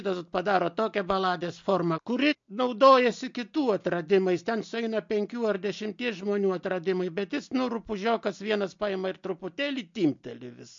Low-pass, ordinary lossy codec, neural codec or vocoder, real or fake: 7.2 kHz; MP3, 48 kbps; none; real